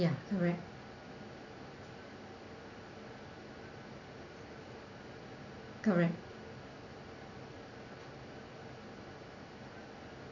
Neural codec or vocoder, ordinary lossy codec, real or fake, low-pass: none; none; real; 7.2 kHz